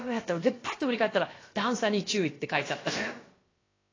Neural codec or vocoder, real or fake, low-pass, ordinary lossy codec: codec, 16 kHz, about 1 kbps, DyCAST, with the encoder's durations; fake; 7.2 kHz; AAC, 32 kbps